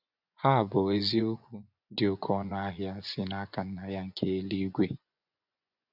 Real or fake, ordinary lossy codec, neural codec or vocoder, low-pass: fake; none; vocoder, 24 kHz, 100 mel bands, Vocos; 5.4 kHz